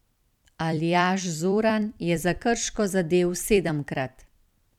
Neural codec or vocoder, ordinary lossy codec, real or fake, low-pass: vocoder, 44.1 kHz, 128 mel bands every 256 samples, BigVGAN v2; none; fake; 19.8 kHz